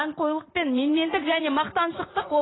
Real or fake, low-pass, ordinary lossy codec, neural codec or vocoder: fake; 7.2 kHz; AAC, 16 kbps; vocoder, 44.1 kHz, 80 mel bands, Vocos